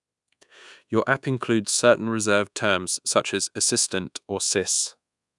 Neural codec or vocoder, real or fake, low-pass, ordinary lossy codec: codec, 24 kHz, 1.2 kbps, DualCodec; fake; 10.8 kHz; none